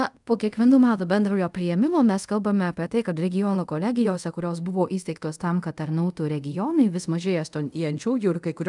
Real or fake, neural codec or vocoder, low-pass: fake; codec, 24 kHz, 0.5 kbps, DualCodec; 10.8 kHz